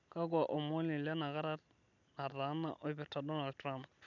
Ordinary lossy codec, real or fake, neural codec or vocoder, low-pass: none; real; none; 7.2 kHz